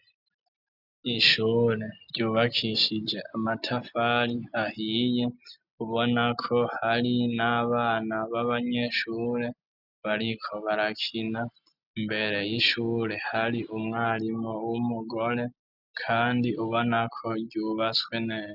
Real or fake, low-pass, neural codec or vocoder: real; 5.4 kHz; none